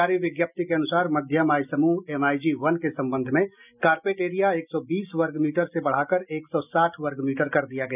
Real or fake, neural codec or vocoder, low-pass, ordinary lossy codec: real; none; 3.6 kHz; none